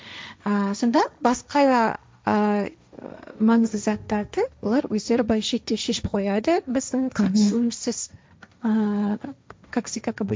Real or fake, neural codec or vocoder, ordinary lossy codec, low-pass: fake; codec, 16 kHz, 1.1 kbps, Voila-Tokenizer; none; none